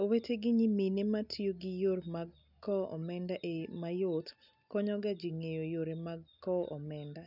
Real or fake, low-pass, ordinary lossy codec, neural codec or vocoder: real; 5.4 kHz; none; none